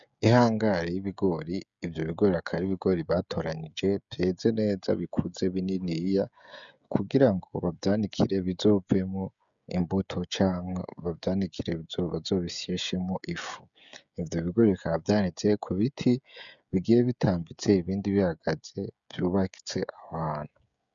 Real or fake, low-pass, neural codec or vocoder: fake; 7.2 kHz; codec, 16 kHz, 16 kbps, FreqCodec, smaller model